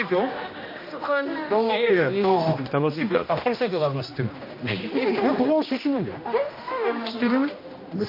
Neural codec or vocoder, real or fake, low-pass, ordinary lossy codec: codec, 16 kHz, 1 kbps, X-Codec, HuBERT features, trained on general audio; fake; 5.4 kHz; MP3, 32 kbps